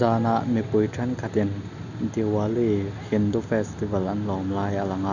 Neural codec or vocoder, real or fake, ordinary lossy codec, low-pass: none; real; none; 7.2 kHz